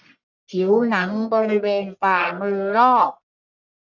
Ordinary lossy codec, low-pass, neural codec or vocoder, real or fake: none; 7.2 kHz; codec, 44.1 kHz, 1.7 kbps, Pupu-Codec; fake